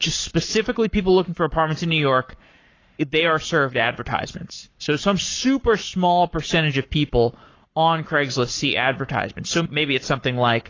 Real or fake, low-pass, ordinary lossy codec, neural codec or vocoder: fake; 7.2 kHz; AAC, 32 kbps; codec, 44.1 kHz, 7.8 kbps, Pupu-Codec